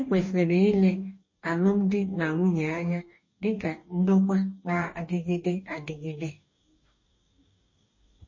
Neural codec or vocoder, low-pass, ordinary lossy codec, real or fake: codec, 44.1 kHz, 2.6 kbps, DAC; 7.2 kHz; MP3, 32 kbps; fake